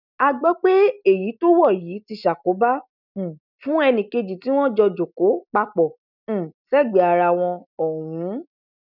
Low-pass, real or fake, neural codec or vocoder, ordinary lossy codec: 5.4 kHz; real; none; none